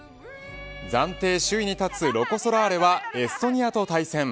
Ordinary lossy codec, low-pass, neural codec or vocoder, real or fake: none; none; none; real